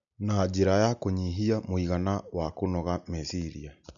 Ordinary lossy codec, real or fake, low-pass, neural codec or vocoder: none; real; 7.2 kHz; none